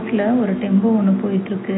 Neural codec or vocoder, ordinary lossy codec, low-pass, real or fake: none; AAC, 16 kbps; 7.2 kHz; real